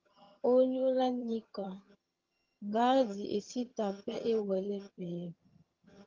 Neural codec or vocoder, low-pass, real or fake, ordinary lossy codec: vocoder, 22.05 kHz, 80 mel bands, HiFi-GAN; 7.2 kHz; fake; Opus, 32 kbps